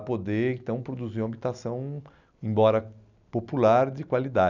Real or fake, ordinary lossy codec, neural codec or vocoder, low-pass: real; none; none; 7.2 kHz